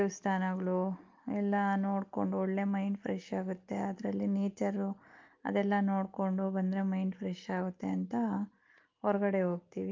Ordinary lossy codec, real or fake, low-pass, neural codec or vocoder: Opus, 32 kbps; real; 7.2 kHz; none